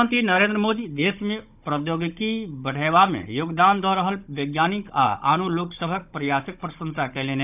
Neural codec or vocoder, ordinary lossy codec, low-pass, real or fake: codec, 16 kHz, 16 kbps, FunCodec, trained on Chinese and English, 50 frames a second; none; 3.6 kHz; fake